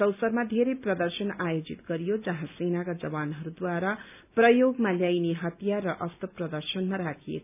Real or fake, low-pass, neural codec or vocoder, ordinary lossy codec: real; 3.6 kHz; none; none